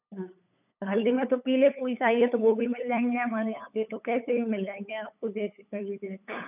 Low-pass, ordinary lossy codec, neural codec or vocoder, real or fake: 3.6 kHz; none; codec, 16 kHz, 8 kbps, FunCodec, trained on LibriTTS, 25 frames a second; fake